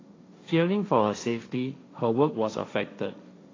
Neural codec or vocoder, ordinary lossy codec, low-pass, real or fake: codec, 16 kHz, 1.1 kbps, Voila-Tokenizer; AAC, 32 kbps; 7.2 kHz; fake